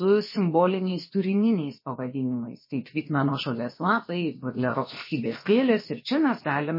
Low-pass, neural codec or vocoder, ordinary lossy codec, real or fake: 5.4 kHz; codec, 16 kHz, about 1 kbps, DyCAST, with the encoder's durations; MP3, 24 kbps; fake